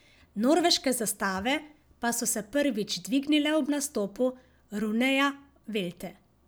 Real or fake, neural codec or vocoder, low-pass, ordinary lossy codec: real; none; none; none